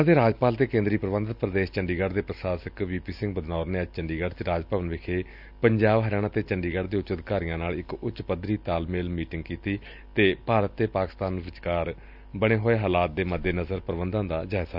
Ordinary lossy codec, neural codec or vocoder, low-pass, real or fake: none; none; 5.4 kHz; real